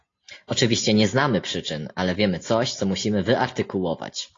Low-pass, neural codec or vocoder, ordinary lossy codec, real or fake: 7.2 kHz; none; AAC, 32 kbps; real